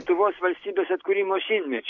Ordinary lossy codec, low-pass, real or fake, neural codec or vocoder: AAC, 48 kbps; 7.2 kHz; real; none